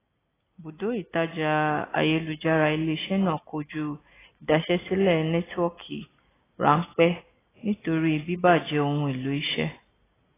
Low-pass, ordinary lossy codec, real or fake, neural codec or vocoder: 3.6 kHz; AAC, 16 kbps; real; none